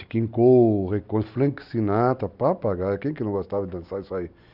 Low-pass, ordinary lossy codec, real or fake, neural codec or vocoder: 5.4 kHz; none; real; none